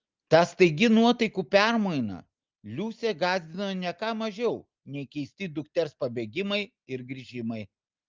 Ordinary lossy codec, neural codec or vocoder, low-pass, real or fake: Opus, 32 kbps; none; 7.2 kHz; real